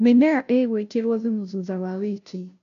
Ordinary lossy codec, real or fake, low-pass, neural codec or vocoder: none; fake; 7.2 kHz; codec, 16 kHz, 0.5 kbps, FunCodec, trained on Chinese and English, 25 frames a second